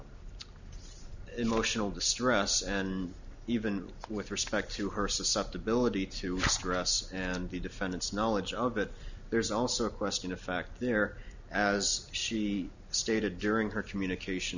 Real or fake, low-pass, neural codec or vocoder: real; 7.2 kHz; none